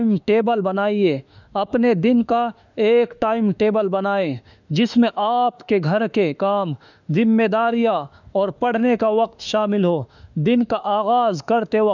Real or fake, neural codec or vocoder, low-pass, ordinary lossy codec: fake; autoencoder, 48 kHz, 32 numbers a frame, DAC-VAE, trained on Japanese speech; 7.2 kHz; none